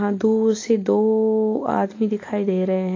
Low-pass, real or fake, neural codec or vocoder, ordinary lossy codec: 7.2 kHz; real; none; AAC, 32 kbps